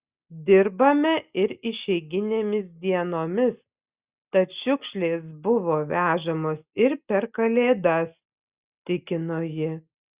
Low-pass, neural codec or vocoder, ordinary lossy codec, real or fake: 3.6 kHz; none; Opus, 64 kbps; real